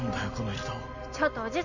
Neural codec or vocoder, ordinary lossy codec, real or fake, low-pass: none; none; real; 7.2 kHz